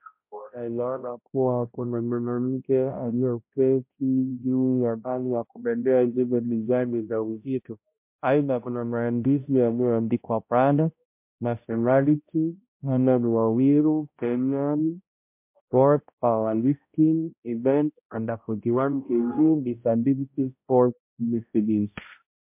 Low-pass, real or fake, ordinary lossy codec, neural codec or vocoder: 3.6 kHz; fake; MP3, 32 kbps; codec, 16 kHz, 0.5 kbps, X-Codec, HuBERT features, trained on balanced general audio